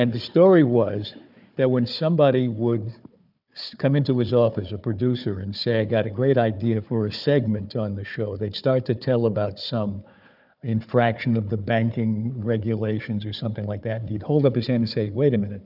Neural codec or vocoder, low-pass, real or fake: codec, 16 kHz, 4 kbps, FunCodec, trained on Chinese and English, 50 frames a second; 5.4 kHz; fake